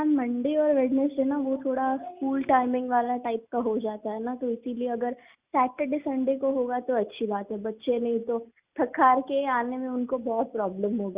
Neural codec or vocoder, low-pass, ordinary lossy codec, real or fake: none; 3.6 kHz; Opus, 64 kbps; real